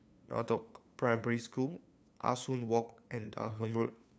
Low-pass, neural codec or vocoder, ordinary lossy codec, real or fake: none; codec, 16 kHz, 2 kbps, FunCodec, trained on LibriTTS, 25 frames a second; none; fake